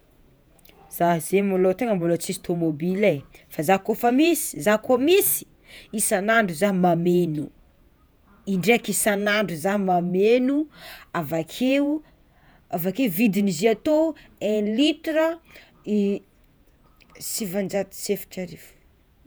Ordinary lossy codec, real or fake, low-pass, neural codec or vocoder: none; fake; none; vocoder, 48 kHz, 128 mel bands, Vocos